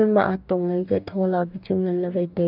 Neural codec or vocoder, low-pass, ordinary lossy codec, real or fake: codec, 44.1 kHz, 2.6 kbps, DAC; 5.4 kHz; none; fake